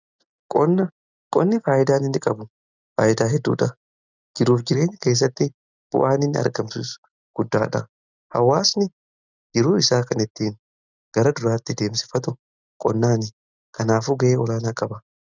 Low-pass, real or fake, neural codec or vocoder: 7.2 kHz; real; none